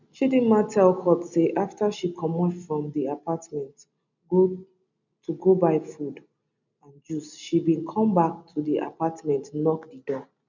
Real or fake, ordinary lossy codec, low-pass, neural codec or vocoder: real; none; 7.2 kHz; none